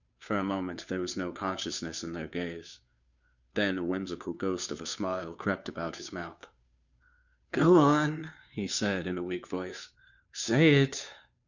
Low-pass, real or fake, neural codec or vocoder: 7.2 kHz; fake; codec, 16 kHz, 2 kbps, FunCodec, trained on Chinese and English, 25 frames a second